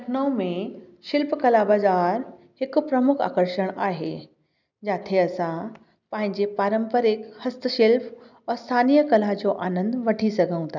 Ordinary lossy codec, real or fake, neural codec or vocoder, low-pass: none; real; none; 7.2 kHz